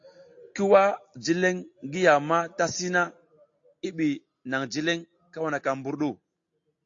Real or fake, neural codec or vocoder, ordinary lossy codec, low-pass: real; none; AAC, 48 kbps; 7.2 kHz